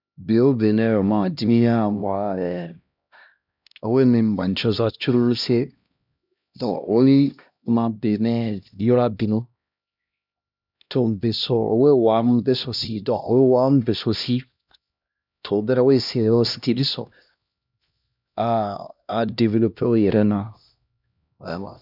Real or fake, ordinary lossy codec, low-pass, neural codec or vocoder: fake; none; 5.4 kHz; codec, 16 kHz, 1 kbps, X-Codec, HuBERT features, trained on LibriSpeech